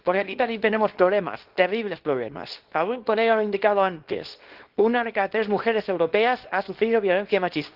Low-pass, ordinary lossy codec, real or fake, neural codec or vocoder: 5.4 kHz; Opus, 16 kbps; fake; codec, 24 kHz, 0.9 kbps, WavTokenizer, small release